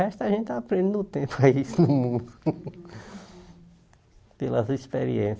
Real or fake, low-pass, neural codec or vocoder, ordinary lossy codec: real; none; none; none